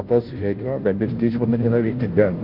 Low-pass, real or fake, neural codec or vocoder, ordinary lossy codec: 5.4 kHz; fake; codec, 16 kHz, 0.5 kbps, FunCodec, trained on Chinese and English, 25 frames a second; Opus, 16 kbps